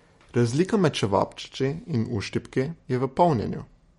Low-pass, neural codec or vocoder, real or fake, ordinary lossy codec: 19.8 kHz; none; real; MP3, 48 kbps